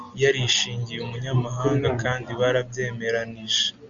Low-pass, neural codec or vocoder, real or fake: 7.2 kHz; none; real